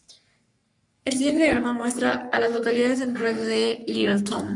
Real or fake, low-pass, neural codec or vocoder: fake; 10.8 kHz; codec, 44.1 kHz, 3.4 kbps, Pupu-Codec